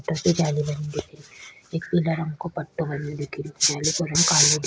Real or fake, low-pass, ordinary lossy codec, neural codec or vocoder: real; none; none; none